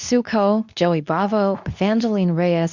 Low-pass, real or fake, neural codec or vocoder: 7.2 kHz; fake; codec, 24 kHz, 0.9 kbps, WavTokenizer, medium speech release version 2